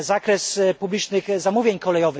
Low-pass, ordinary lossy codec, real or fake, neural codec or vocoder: none; none; real; none